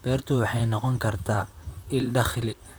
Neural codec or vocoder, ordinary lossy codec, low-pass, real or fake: vocoder, 44.1 kHz, 128 mel bands, Pupu-Vocoder; none; none; fake